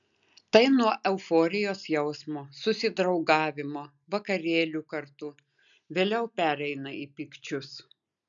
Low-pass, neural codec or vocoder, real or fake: 7.2 kHz; none; real